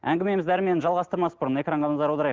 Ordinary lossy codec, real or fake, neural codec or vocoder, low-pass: Opus, 16 kbps; real; none; 7.2 kHz